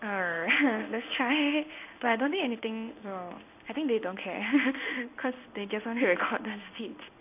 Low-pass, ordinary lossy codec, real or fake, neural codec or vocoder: 3.6 kHz; none; fake; codec, 16 kHz in and 24 kHz out, 1 kbps, XY-Tokenizer